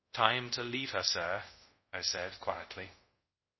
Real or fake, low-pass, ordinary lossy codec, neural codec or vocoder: fake; 7.2 kHz; MP3, 24 kbps; codec, 24 kHz, 0.5 kbps, DualCodec